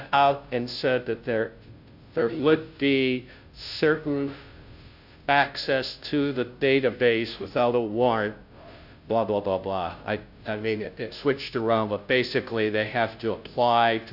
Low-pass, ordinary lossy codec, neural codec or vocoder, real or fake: 5.4 kHz; AAC, 48 kbps; codec, 16 kHz, 0.5 kbps, FunCodec, trained on Chinese and English, 25 frames a second; fake